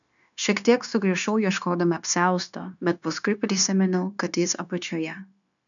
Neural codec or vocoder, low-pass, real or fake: codec, 16 kHz, 0.9 kbps, LongCat-Audio-Codec; 7.2 kHz; fake